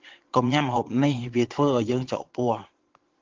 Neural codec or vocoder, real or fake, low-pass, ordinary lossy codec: vocoder, 22.05 kHz, 80 mel bands, Vocos; fake; 7.2 kHz; Opus, 16 kbps